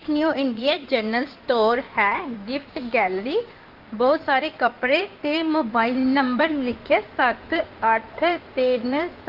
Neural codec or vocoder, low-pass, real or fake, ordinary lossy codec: codec, 16 kHz in and 24 kHz out, 2.2 kbps, FireRedTTS-2 codec; 5.4 kHz; fake; Opus, 32 kbps